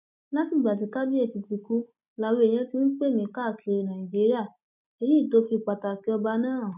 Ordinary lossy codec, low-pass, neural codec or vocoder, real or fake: none; 3.6 kHz; none; real